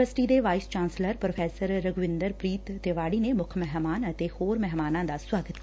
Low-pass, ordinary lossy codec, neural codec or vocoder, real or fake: none; none; none; real